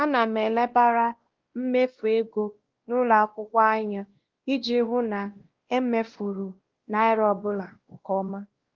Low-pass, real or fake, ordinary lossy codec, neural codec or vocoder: 7.2 kHz; fake; Opus, 16 kbps; codec, 16 kHz, 1 kbps, X-Codec, WavLM features, trained on Multilingual LibriSpeech